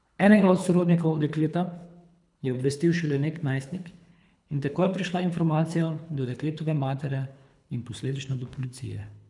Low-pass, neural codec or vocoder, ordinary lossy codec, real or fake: 10.8 kHz; codec, 24 kHz, 3 kbps, HILCodec; none; fake